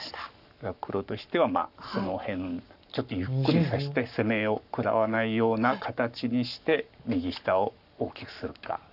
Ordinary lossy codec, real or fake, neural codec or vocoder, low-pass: none; fake; codec, 44.1 kHz, 7.8 kbps, Pupu-Codec; 5.4 kHz